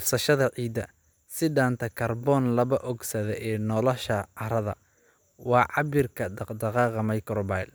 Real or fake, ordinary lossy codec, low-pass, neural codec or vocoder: real; none; none; none